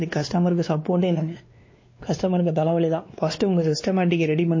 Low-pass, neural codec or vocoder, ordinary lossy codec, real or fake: 7.2 kHz; codec, 16 kHz, 2 kbps, X-Codec, WavLM features, trained on Multilingual LibriSpeech; MP3, 32 kbps; fake